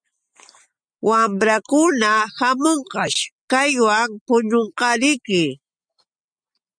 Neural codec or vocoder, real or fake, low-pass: none; real; 9.9 kHz